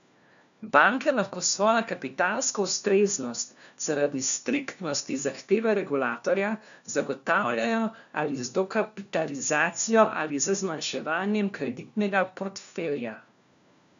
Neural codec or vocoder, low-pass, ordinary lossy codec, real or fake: codec, 16 kHz, 1 kbps, FunCodec, trained on LibriTTS, 50 frames a second; 7.2 kHz; none; fake